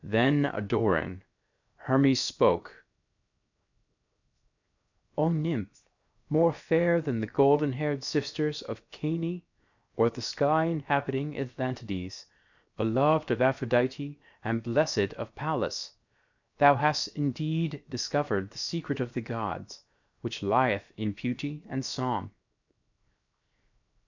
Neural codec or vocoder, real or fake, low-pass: codec, 16 kHz, 0.7 kbps, FocalCodec; fake; 7.2 kHz